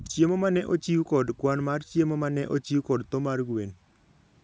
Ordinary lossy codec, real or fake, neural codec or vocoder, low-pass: none; real; none; none